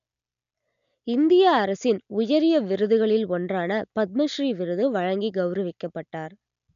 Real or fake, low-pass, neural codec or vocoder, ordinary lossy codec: real; 7.2 kHz; none; none